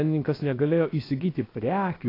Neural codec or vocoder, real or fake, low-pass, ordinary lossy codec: codec, 16 kHz, about 1 kbps, DyCAST, with the encoder's durations; fake; 5.4 kHz; AAC, 24 kbps